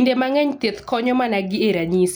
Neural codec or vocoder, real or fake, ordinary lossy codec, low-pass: none; real; none; none